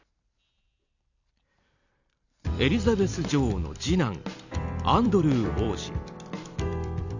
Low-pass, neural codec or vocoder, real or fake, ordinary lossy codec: 7.2 kHz; vocoder, 44.1 kHz, 128 mel bands every 256 samples, BigVGAN v2; fake; none